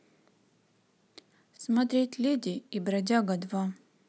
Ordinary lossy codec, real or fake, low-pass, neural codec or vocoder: none; real; none; none